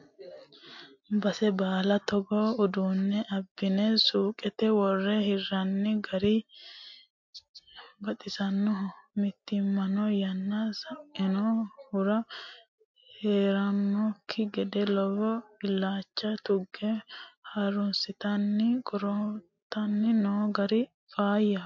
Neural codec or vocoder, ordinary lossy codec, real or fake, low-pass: none; MP3, 48 kbps; real; 7.2 kHz